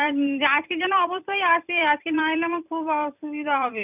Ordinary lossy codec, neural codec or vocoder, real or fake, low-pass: none; vocoder, 44.1 kHz, 128 mel bands every 256 samples, BigVGAN v2; fake; 3.6 kHz